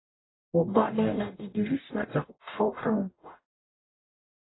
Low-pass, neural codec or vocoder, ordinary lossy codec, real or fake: 7.2 kHz; codec, 44.1 kHz, 0.9 kbps, DAC; AAC, 16 kbps; fake